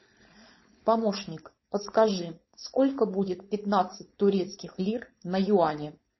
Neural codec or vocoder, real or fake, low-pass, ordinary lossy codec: codec, 16 kHz, 4.8 kbps, FACodec; fake; 7.2 kHz; MP3, 24 kbps